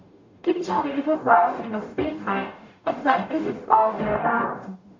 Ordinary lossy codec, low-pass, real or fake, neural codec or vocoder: MP3, 48 kbps; 7.2 kHz; fake; codec, 44.1 kHz, 0.9 kbps, DAC